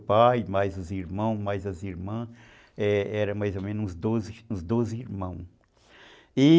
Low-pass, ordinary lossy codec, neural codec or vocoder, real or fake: none; none; none; real